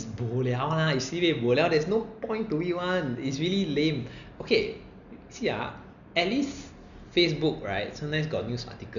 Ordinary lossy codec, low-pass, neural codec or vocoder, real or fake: none; 7.2 kHz; none; real